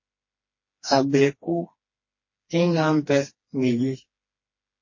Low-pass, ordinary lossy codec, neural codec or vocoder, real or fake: 7.2 kHz; MP3, 32 kbps; codec, 16 kHz, 2 kbps, FreqCodec, smaller model; fake